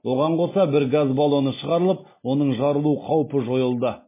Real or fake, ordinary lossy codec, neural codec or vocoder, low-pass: real; MP3, 16 kbps; none; 3.6 kHz